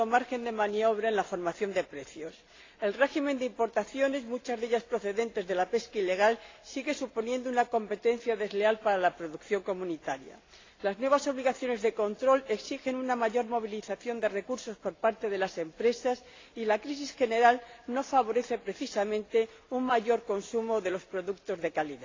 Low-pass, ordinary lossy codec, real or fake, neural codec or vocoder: 7.2 kHz; AAC, 32 kbps; real; none